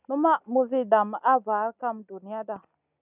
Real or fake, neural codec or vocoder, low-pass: real; none; 3.6 kHz